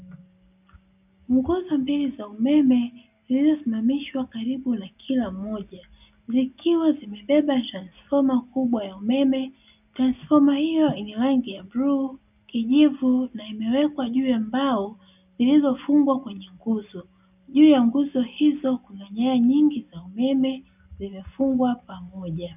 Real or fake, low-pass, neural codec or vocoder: real; 3.6 kHz; none